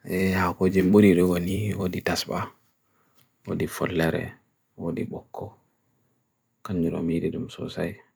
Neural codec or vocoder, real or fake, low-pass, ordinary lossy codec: vocoder, 44.1 kHz, 128 mel bands every 256 samples, BigVGAN v2; fake; none; none